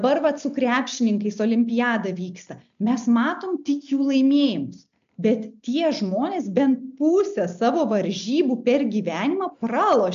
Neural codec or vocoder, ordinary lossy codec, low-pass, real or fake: none; MP3, 64 kbps; 7.2 kHz; real